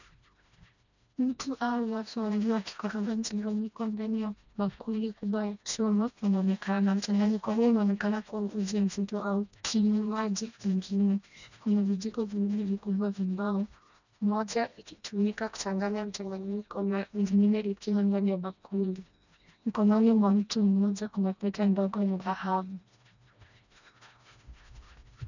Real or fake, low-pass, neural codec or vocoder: fake; 7.2 kHz; codec, 16 kHz, 1 kbps, FreqCodec, smaller model